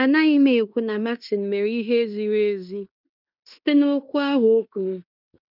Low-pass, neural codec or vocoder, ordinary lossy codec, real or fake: 5.4 kHz; codec, 16 kHz in and 24 kHz out, 0.9 kbps, LongCat-Audio-Codec, fine tuned four codebook decoder; none; fake